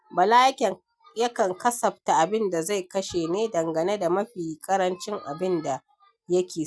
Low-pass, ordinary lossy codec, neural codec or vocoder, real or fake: none; none; none; real